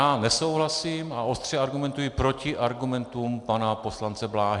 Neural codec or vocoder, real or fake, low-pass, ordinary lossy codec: none; real; 10.8 kHz; Opus, 64 kbps